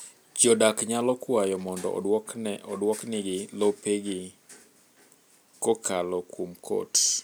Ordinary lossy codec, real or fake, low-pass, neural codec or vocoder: none; real; none; none